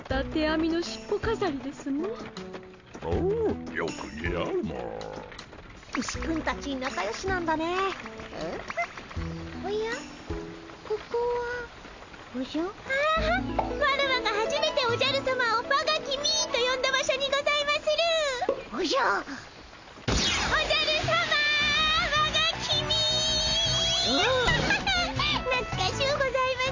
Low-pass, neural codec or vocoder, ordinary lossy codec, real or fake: 7.2 kHz; none; AAC, 48 kbps; real